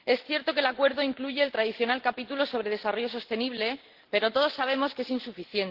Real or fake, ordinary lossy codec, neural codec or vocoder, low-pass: real; Opus, 16 kbps; none; 5.4 kHz